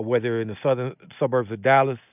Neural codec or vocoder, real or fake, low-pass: none; real; 3.6 kHz